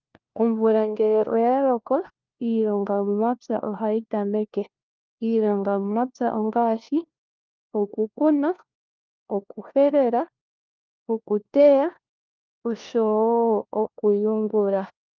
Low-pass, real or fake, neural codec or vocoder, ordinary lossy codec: 7.2 kHz; fake; codec, 16 kHz, 1 kbps, FunCodec, trained on LibriTTS, 50 frames a second; Opus, 32 kbps